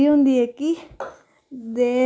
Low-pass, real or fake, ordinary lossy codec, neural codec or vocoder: none; real; none; none